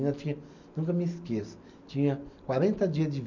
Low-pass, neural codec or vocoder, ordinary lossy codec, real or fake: 7.2 kHz; none; none; real